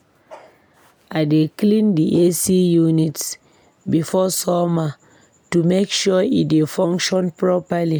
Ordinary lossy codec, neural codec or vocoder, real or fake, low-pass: none; none; real; none